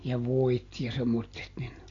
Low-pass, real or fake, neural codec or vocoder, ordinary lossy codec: 7.2 kHz; real; none; AAC, 32 kbps